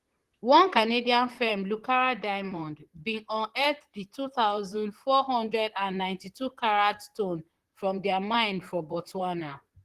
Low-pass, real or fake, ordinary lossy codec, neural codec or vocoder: 14.4 kHz; fake; Opus, 16 kbps; vocoder, 44.1 kHz, 128 mel bands, Pupu-Vocoder